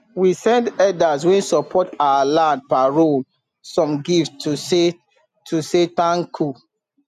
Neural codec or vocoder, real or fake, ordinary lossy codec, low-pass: none; real; none; 14.4 kHz